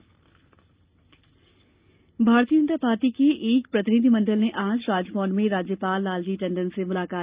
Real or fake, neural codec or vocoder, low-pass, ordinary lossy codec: real; none; 3.6 kHz; Opus, 24 kbps